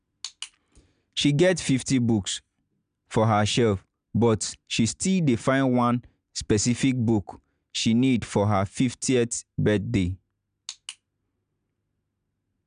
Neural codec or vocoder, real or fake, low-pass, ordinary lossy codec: none; real; 9.9 kHz; none